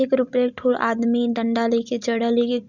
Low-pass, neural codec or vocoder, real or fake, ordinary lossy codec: 7.2 kHz; none; real; Opus, 64 kbps